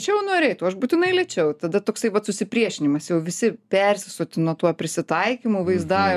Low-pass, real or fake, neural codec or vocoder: 14.4 kHz; real; none